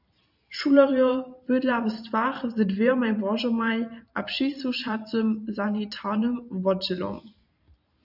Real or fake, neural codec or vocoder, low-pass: fake; vocoder, 44.1 kHz, 128 mel bands every 512 samples, BigVGAN v2; 5.4 kHz